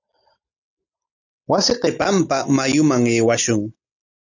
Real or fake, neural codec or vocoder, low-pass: real; none; 7.2 kHz